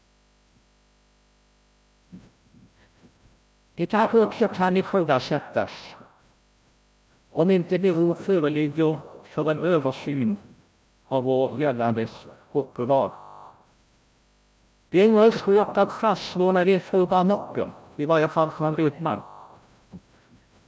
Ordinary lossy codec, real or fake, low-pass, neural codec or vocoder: none; fake; none; codec, 16 kHz, 0.5 kbps, FreqCodec, larger model